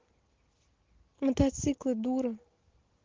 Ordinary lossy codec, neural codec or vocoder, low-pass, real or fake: Opus, 16 kbps; none; 7.2 kHz; real